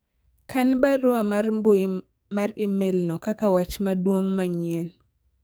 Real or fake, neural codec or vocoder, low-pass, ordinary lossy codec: fake; codec, 44.1 kHz, 2.6 kbps, SNAC; none; none